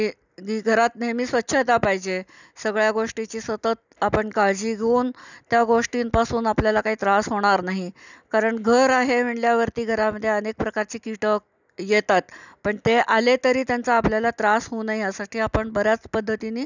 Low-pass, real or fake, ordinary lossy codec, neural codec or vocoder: 7.2 kHz; real; none; none